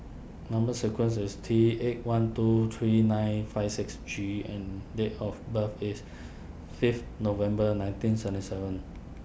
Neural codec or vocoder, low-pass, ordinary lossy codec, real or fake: none; none; none; real